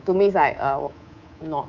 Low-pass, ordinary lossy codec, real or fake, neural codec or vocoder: 7.2 kHz; none; fake; codec, 24 kHz, 3.1 kbps, DualCodec